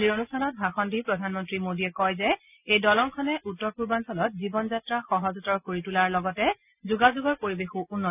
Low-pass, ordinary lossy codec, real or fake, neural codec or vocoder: 3.6 kHz; none; real; none